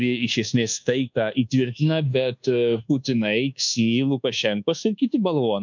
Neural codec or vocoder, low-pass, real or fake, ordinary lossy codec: codec, 24 kHz, 1.2 kbps, DualCodec; 7.2 kHz; fake; MP3, 64 kbps